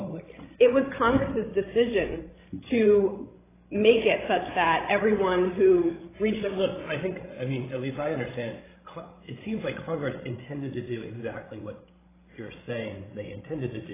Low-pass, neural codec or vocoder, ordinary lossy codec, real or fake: 3.6 kHz; codec, 16 kHz, 16 kbps, FreqCodec, larger model; AAC, 16 kbps; fake